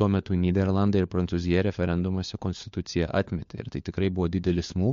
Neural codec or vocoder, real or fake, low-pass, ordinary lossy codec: codec, 16 kHz, 4 kbps, FunCodec, trained on LibriTTS, 50 frames a second; fake; 7.2 kHz; MP3, 48 kbps